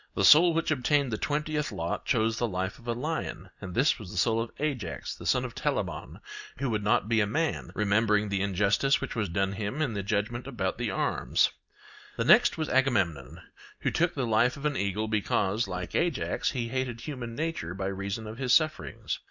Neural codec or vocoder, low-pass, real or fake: none; 7.2 kHz; real